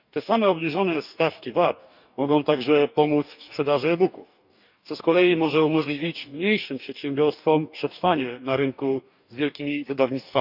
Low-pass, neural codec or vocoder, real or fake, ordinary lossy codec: 5.4 kHz; codec, 44.1 kHz, 2.6 kbps, DAC; fake; none